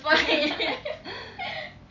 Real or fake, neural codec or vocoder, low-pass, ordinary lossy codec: real; none; 7.2 kHz; none